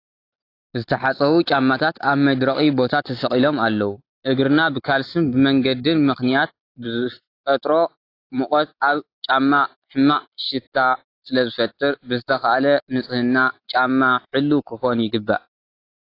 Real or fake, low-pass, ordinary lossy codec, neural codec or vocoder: real; 5.4 kHz; AAC, 32 kbps; none